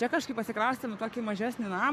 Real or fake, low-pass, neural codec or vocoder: fake; 14.4 kHz; codec, 44.1 kHz, 7.8 kbps, Pupu-Codec